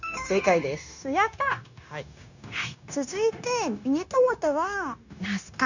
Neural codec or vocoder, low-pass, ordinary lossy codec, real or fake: codec, 16 kHz, 0.9 kbps, LongCat-Audio-Codec; 7.2 kHz; none; fake